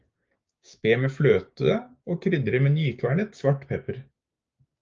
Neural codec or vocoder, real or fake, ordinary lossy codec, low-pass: codec, 16 kHz, 6 kbps, DAC; fake; Opus, 24 kbps; 7.2 kHz